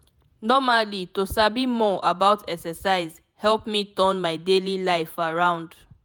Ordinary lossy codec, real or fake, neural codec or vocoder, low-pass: none; fake; vocoder, 48 kHz, 128 mel bands, Vocos; none